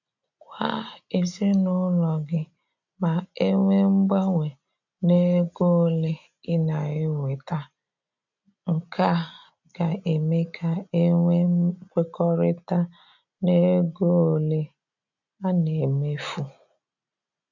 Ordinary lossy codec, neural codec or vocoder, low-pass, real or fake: none; none; 7.2 kHz; real